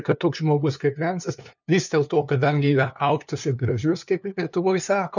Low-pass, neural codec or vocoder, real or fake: 7.2 kHz; codec, 16 kHz, 2 kbps, FunCodec, trained on LibriTTS, 25 frames a second; fake